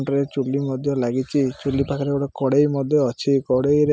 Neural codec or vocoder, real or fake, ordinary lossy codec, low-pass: none; real; none; none